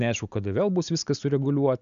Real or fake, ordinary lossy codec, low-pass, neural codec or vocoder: real; AAC, 96 kbps; 7.2 kHz; none